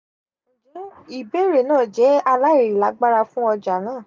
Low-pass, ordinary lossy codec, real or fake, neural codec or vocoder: none; none; real; none